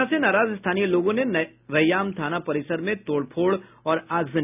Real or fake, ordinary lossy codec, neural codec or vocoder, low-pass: real; none; none; 3.6 kHz